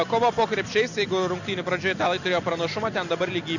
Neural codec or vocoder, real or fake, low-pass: none; real; 7.2 kHz